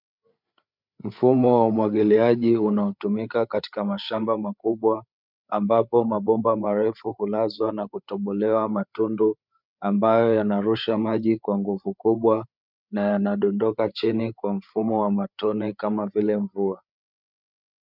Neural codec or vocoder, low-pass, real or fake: codec, 16 kHz, 8 kbps, FreqCodec, larger model; 5.4 kHz; fake